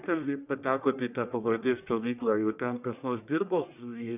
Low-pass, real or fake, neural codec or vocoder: 3.6 kHz; fake; codec, 44.1 kHz, 1.7 kbps, Pupu-Codec